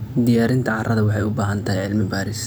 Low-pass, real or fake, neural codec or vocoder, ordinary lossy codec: none; real; none; none